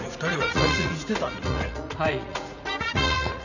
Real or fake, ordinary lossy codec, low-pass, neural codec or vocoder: fake; none; 7.2 kHz; vocoder, 44.1 kHz, 80 mel bands, Vocos